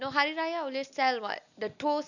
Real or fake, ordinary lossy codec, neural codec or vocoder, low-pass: real; none; none; 7.2 kHz